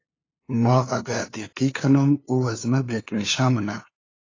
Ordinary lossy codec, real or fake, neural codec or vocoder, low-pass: AAC, 32 kbps; fake; codec, 16 kHz, 2 kbps, FunCodec, trained on LibriTTS, 25 frames a second; 7.2 kHz